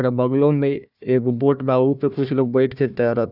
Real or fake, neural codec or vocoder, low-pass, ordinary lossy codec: fake; codec, 16 kHz, 1 kbps, FunCodec, trained on Chinese and English, 50 frames a second; 5.4 kHz; none